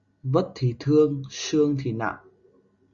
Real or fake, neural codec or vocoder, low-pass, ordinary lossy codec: real; none; 7.2 kHz; Opus, 64 kbps